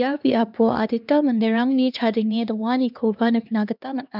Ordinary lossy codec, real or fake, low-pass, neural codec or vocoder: none; fake; 5.4 kHz; codec, 16 kHz, 2 kbps, X-Codec, HuBERT features, trained on LibriSpeech